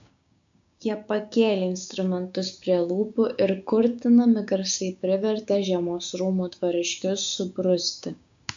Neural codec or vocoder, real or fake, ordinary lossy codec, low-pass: codec, 16 kHz, 6 kbps, DAC; fake; AAC, 64 kbps; 7.2 kHz